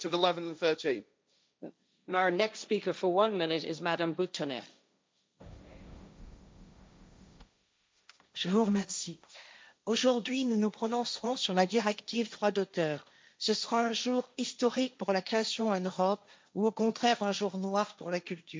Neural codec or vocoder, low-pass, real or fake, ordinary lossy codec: codec, 16 kHz, 1.1 kbps, Voila-Tokenizer; none; fake; none